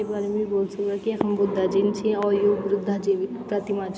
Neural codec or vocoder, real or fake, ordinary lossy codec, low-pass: none; real; none; none